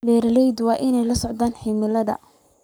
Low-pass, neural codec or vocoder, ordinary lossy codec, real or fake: none; codec, 44.1 kHz, 7.8 kbps, Pupu-Codec; none; fake